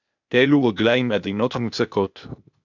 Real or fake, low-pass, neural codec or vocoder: fake; 7.2 kHz; codec, 16 kHz, 0.8 kbps, ZipCodec